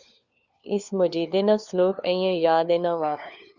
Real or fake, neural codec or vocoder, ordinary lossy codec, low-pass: fake; codec, 16 kHz, 4 kbps, FunCodec, trained on LibriTTS, 50 frames a second; Opus, 64 kbps; 7.2 kHz